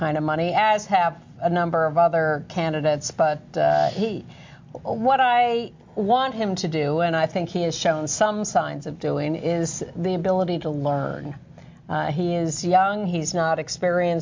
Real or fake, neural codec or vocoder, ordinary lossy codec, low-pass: real; none; MP3, 48 kbps; 7.2 kHz